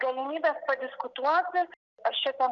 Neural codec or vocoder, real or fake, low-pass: none; real; 7.2 kHz